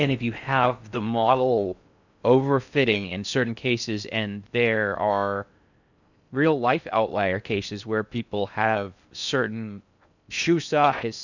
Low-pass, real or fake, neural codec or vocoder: 7.2 kHz; fake; codec, 16 kHz in and 24 kHz out, 0.6 kbps, FocalCodec, streaming, 4096 codes